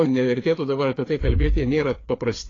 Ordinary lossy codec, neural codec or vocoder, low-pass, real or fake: AAC, 32 kbps; codec, 16 kHz, 4 kbps, FreqCodec, larger model; 7.2 kHz; fake